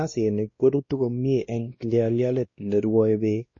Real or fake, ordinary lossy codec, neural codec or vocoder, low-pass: fake; MP3, 32 kbps; codec, 16 kHz, 1 kbps, X-Codec, WavLM features, trained on Multilingual LibriSpeech; 7.2 kHz